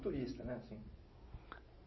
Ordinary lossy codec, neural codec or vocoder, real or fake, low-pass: MP3, 24 kbps; none; real; 7.2 kHz